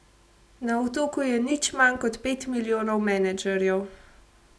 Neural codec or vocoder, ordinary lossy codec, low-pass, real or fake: none; none; none; real